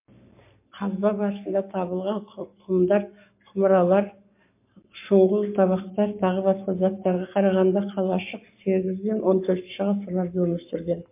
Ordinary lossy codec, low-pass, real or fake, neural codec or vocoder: MP3, 24 kbps; 3.6 kHz; fake; codec, 44.1 kHz, 7.8 kbps, Pupu-Codec